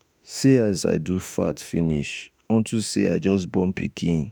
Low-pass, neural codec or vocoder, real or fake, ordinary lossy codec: none; autoencoder, 48 kHz, 32 numbers a frame, DAC-VAE, trained on Japanese speech; fake; none